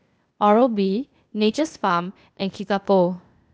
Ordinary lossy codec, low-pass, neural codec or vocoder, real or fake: none; none; codec, 16 kHz, 0.8 kbps, ZipCodec; fake